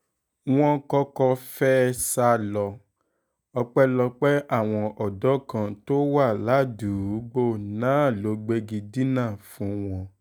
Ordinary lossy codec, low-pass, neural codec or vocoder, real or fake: none; none; none; real